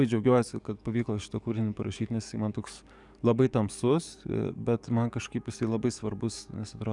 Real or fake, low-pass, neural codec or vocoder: fake; 10.8 kHz; codec, 44.1 kHz, 7.8 kbps, DAC